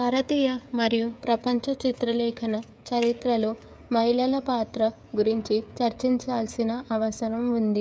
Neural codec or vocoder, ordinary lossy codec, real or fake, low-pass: codec, 16 kHz, 16 kbps, FreqCodec, smaller model; none; fake; none